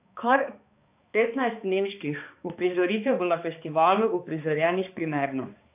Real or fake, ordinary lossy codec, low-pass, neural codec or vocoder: fake; AAC, 32 kbps; 3.6 kHz; codec, 16 kHz, 2 kbps, X-Codec, HuBERT features, trained on balanced general audio